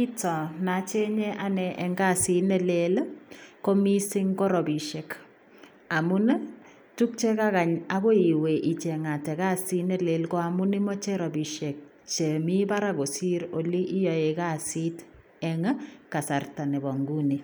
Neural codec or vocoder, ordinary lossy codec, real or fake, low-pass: none; none; real; none